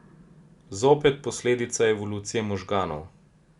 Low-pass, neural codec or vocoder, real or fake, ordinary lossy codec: 10.8 kHz; none; real; Opus, 64 kbps